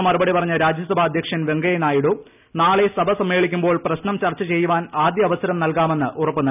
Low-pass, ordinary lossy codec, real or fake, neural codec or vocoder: 3.6 kHz; none; real; none